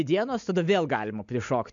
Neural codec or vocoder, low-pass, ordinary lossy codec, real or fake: none; 7.2 kHz; MP3, 64 kbps; real